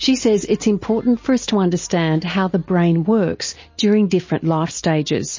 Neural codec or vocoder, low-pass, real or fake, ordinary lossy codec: none; 7.2 kHz; real; MP3, 32 kbps